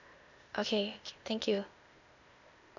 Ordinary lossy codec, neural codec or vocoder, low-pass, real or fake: none; codec, 16 kHz, 0.8 kbps, ZipCodec; 7.2 kHz; fake